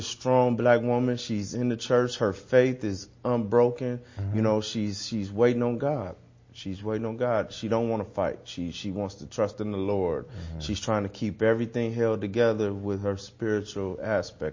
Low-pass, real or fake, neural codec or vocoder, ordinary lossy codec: 7.2 kHz; real; none; MP3, 32 kbps